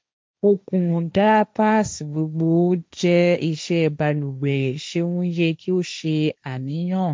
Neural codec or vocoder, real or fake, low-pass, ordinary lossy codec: codec, 16 kHz, 1.1 kbps, Voila-Tokenizer; fake; none; none